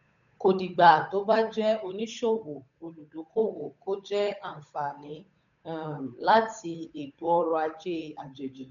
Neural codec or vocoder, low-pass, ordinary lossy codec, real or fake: codec, 16 kHz, 8 kbps, FunCodec, trained on Chinese and English, 25 frames a second; 7.2 kHz; none; fake